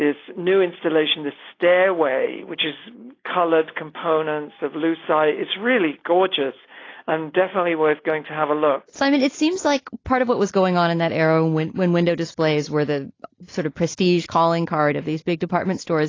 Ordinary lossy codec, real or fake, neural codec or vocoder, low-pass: AAC, 32 kbps; real; none; 7.2 kHz